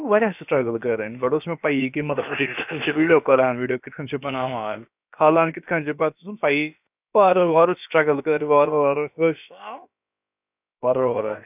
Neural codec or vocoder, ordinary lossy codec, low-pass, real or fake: codec, 16 kHz, about 1 kbps, DyCAST, with the encoder's durations; none; 3.6 kHz; fake